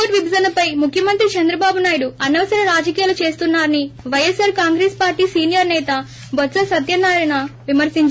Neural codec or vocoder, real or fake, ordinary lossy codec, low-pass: none; real; none; none